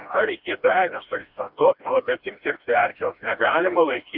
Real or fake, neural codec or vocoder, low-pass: fake; codec, 16 kHz, 1 kbps, FreqCodec, smaller model; 5.4 kHz